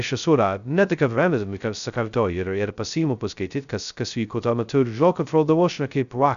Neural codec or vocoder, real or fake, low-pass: codec, 16 kHz, 0.2 kbps, FocalCodec; fake; 7.2 kHz